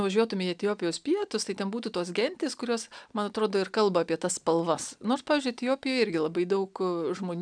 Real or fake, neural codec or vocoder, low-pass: real; none; 9.9 kHz